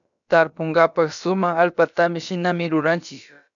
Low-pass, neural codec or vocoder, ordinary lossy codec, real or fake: 7.2 kHz; codec, 16 kHz, about 1 kbps, DyCAST, with the encoder's durations; MP3, 96 kbps; fake